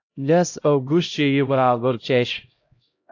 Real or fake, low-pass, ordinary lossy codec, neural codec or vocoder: fake; 7.2 kHz; AAC, 48 kbps; codec, 16 kHz, 0.5 kbps, X-Codec, HuBERT features, trained on LibriSpeech